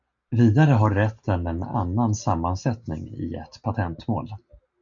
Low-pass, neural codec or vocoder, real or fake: 7.2 kHz; none; real